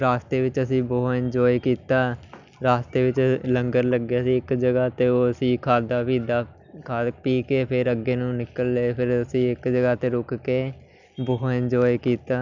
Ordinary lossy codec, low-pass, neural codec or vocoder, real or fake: none; 7.2 kHz; none; real